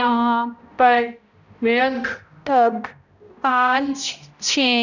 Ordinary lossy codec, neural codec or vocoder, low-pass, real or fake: none; codec, 16 kHz, 0.5 kbps, X-Codec, HuBERT features, trained on balanced general audio; 7.2 kHz; fake